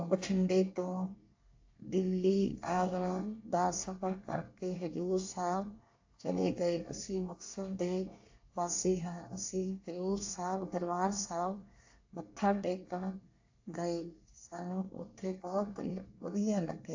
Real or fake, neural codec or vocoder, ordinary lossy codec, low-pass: fake; codec, 24 kHz, 1 kbps, SNAC; AAC, 48 kbps; 7.2 kHz